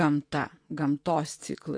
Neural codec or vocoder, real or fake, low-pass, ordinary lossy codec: vocoder, 24 kHz, 100 mel bands, Vocos; fake; 9.9 kHz; AAC, 48 kbps